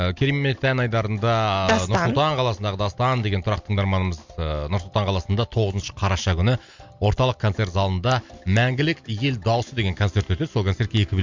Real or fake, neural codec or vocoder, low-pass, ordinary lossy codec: real; none; 7.2 kHz; MP3, 64 kbps